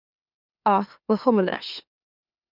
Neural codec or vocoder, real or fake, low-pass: autoencoder, 44.1 kHz, a latent of 192 numbers a frame, MeloTTS; fake; 5.4 kHz